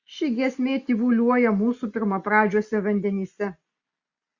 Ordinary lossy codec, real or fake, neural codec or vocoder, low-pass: Opus, 64 kbps; real; none; 7.2 kHz